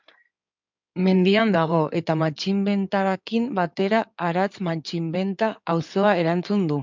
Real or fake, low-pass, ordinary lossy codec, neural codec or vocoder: fake; 7.2 kHz; MP3, 64 kbps; codec, 16 kHz in and 24 kHz out, 2.2 kbps, FireRedTTS-2 codec